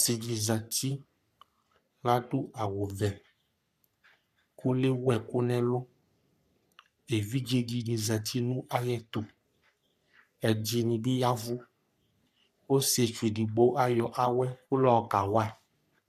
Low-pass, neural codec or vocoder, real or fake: 14.4 kHz; codec, 44.1 kHz, 3.4 kbps, Pupu-Codec; fake